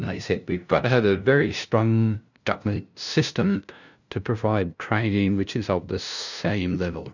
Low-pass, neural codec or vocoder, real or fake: 7.2 kHz; codec, 16 kHz, 0.5 kbps, FunCodec, trained on LibriTTS, 25 frames a second; fake